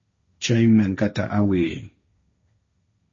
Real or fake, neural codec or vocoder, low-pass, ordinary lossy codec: fake; codec, 16 kHz, 1.1 kbps, Voila-Tokenizer; 7.2 kHz; MP3, 32 kbps